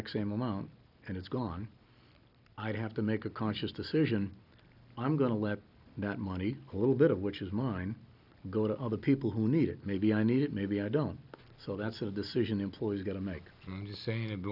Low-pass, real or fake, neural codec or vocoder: 5.4 kHz; real; none